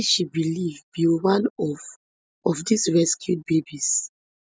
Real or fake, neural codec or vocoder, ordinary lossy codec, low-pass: real; none; none; none